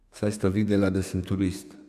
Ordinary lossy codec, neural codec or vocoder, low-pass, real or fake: none; codec, 32 kHz, 1.9 kbps, SNAC; 14.4 kHz; fake